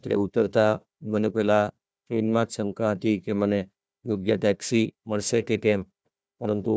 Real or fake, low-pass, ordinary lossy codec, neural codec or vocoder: fake; none; none; codec, 16 kHz, 1 kbps, FunCodec, trained on Chinese and English, 50 frames a second